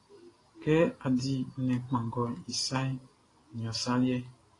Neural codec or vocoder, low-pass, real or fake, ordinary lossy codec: none; 10.8 kHz; real; AAC, 32 kbps